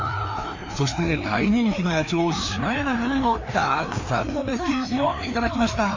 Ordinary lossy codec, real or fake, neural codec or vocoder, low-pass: MP3, 48 kbps; fake; codec, 16 kHz, 2 kbps, FreqCodec, larger model; 7.2 kHz